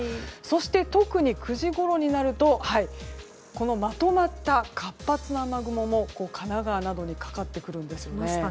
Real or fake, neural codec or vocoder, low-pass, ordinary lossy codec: real; none; none; none